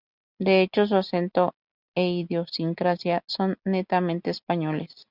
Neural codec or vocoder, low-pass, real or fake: none; 5.4 kHz; real